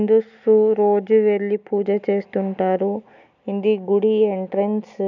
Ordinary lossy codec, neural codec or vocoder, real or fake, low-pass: none; none; real; 7.2 kHz